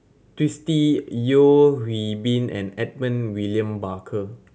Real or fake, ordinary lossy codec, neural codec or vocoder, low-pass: real; none; none; none